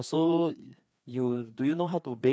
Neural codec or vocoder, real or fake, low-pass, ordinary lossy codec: codec, 16 kHz, 2 kbps, FreqCodec, larger model; fake; none; none